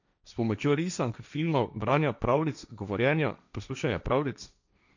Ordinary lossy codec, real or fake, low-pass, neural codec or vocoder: none; fake; 7.2 kHz; codec, 16 kHz, 1.1 kbps, Voila-Tokenizer